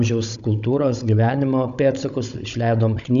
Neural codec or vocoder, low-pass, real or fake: codec, 16 kHz, 16 kbps, FunCodec, trained on Chinese and English, 50 frames a second; 7.2 kHz; fake